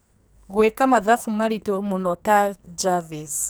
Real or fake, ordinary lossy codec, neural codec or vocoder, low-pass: fake; none; codec, 44.1 kHz, 2.6 kbps, SNAC; none